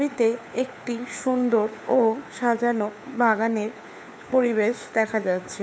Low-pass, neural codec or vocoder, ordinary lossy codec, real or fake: none; codec, 16 kHz, 4 kbps, FunCodec, trained on LibriTTS, 50 frames a second; none; fake